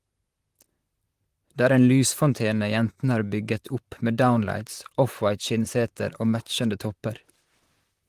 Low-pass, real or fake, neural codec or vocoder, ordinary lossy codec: 14.4 kHz; fake; vocoder, 44.1 kHz, 128 mel bands, Pupu-Vocoder; Opus, 24 kbps